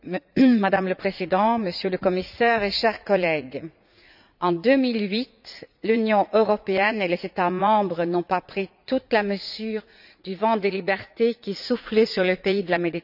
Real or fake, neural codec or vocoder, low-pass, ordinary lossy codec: fake; vocoder, 44.1 kHz, 80 mel bands, Vocos; 5.4 kHz; none